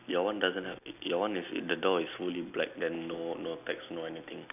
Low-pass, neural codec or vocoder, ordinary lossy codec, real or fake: 3.6 kHz; none; none; real